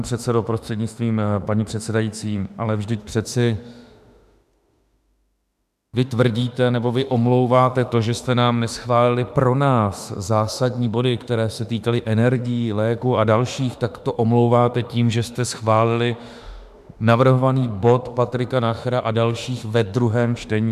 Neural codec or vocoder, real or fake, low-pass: autoencoder, 48 kHz, 32 numbers a frame, DAC-VAE, trained on Japanese speech; fake; 14.4 kHz